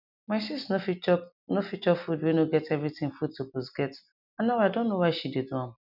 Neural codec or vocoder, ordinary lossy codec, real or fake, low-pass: none; none; real; 5.4 kHz